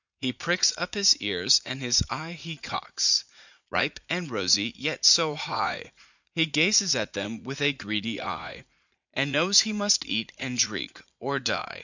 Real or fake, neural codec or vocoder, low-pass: fake; vocoder, 44.1 kHz, 80 mel bands, Vocos; 7.2 kHz